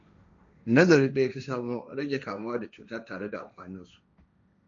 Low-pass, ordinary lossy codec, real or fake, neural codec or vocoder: 7.2 kHz; MP3, 96 kbps; fake; codec, 16 kHz, 1.1 kbps, Voila-Tokenizer